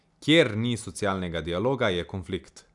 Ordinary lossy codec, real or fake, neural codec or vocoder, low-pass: none; real; none; 10.8 kHz